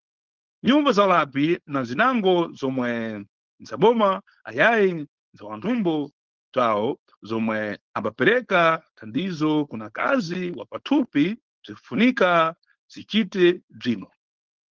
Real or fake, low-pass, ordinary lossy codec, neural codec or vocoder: fake; 7.2 kHz; Opus, 16 kbps; codec, 16 kHz, 4.8 kbps, FACodec